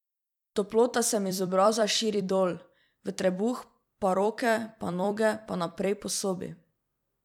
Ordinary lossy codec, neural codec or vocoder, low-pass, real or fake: none; vocoder, 44.1 kHz, 128 mel bands every 256 samples, BigVGAN v2; 19.8 kHz; fake